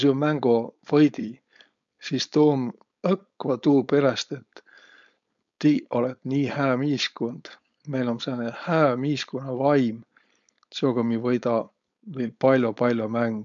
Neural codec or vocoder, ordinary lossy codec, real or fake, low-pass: codec, 16 kHz, 4.8 kbps, FACodec; MP3, 64 kbps; fake; 7.2 kHz